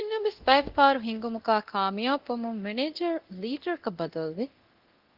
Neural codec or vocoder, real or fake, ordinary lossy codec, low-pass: codec, 24 kHz, 0.9 kbps, DualCodec; fake; Opus, 16 kbps; 5.4 kHz